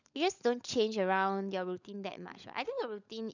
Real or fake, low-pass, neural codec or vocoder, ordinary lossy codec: fake; 7.2 kHz; codec, 16 kHz, 16 kbps, FunCodec, trained on LibriTTS, 50 frames a second; none